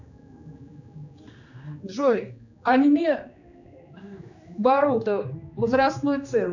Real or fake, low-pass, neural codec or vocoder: fake; 7.2 kHz; codec, 16 kHz, 2 kbps, X-Codec, HuBERT features, trained on general audio